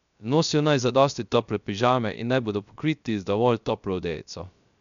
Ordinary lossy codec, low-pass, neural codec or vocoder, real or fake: none; 7.2 kHz; codec, 16 kHz, 0.3 kbps, FocalCodec; fake